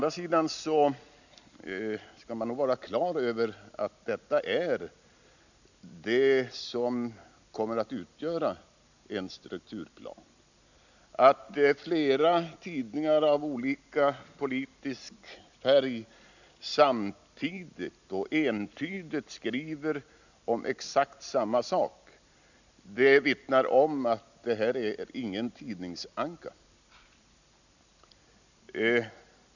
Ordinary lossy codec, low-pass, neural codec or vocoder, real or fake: none; 7.2 kHz; none; real